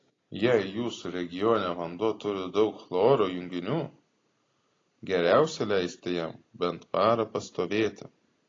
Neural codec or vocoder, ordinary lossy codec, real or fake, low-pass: none; AAC, 32 kbps; real; 7.2 kHz